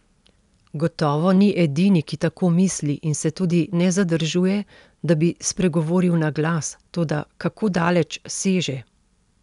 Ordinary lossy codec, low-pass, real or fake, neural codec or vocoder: none; 10.8 kHz; real; none